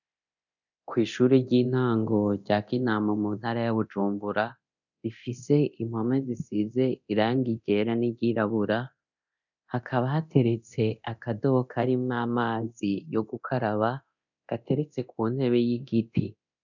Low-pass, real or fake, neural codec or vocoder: 7.2 kHz; fake; codec, 24 kHz, 0.9 kbps, DualCodec